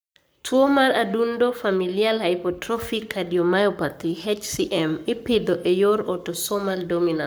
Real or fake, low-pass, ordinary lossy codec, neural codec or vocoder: fake; none; none; codec, 44.1 kHz, 7.8 kbps, Pupu-Codec